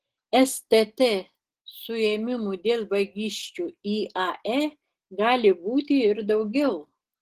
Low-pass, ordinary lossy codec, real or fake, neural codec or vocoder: 14.4 kHz; Opus, 16 kbps; real; none